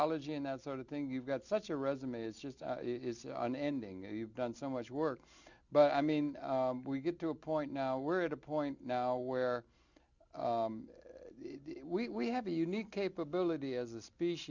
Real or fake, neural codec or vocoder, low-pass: real; none; 7.2 kHz